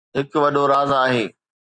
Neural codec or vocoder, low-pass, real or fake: none; 9.9 kHz; real